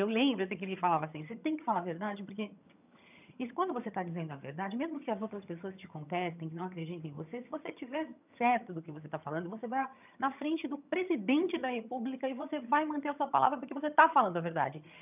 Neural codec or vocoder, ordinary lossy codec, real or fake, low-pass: vocoder, 22.05 kHz, 80 mel bands, HiFi-GAN; none; fake; 3.6 kHz